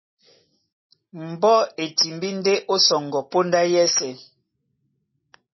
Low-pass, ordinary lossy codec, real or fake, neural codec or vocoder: 7.2 kHz; MP3, 24 kbps; real; none